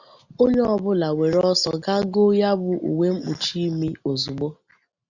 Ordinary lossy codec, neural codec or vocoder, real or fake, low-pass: Opus, 64 kbps; none; real; 7.2 kHz